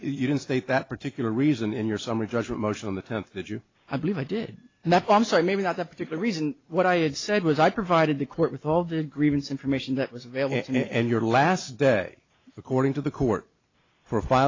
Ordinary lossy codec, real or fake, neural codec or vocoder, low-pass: AAC, 32 kbps; real; none; 7.2 kHz